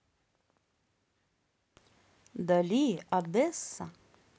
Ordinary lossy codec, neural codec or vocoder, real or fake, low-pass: none; none; real; none